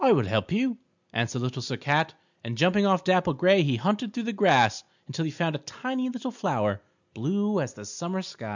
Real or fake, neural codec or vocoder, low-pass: real; none; 7.2 kHz